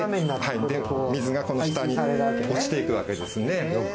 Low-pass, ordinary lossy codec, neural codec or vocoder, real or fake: none; none; none; real